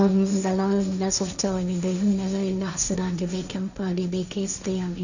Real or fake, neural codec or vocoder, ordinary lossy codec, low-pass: fake; codec, 16 kHz, 1.1 kbps, Voila-Tokenizer; none; 7.2 kHz